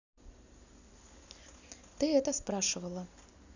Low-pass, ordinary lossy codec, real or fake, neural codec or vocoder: 7.2 kHz; none; real; none